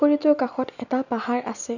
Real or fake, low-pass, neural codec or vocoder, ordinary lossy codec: real; 7.2 kHz; none; none